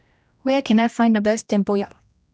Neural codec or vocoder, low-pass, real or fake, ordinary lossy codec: codec, 16 kHz, 1 kbps, X-Codec, HuBERT features, trained on general audio; none; fake; none